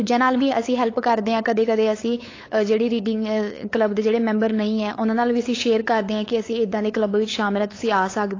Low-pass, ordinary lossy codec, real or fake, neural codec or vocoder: 7.2 kHz; AAC, 32 kbps; fake; codec, 16 kHz, 8 kbps, FunCodec, trained on LibriTTS, 25 frames a second